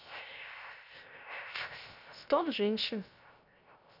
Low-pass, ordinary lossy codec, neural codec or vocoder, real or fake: 5.4 kHz; none; codec, 16 kHz, 0.3 kbps, FocalCodec; fake